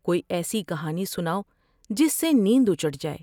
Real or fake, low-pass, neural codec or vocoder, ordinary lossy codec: real; 19.8 kHz; none; none